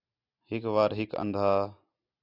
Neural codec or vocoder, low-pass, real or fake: none; 5.4 kHz; real